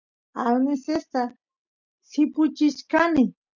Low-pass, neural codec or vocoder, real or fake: 7.2 kHz; none; real